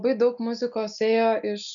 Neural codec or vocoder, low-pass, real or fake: none; 7.2 kHz; real